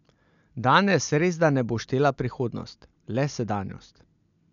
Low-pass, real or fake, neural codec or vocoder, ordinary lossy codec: 7.2 kHz; real; none; none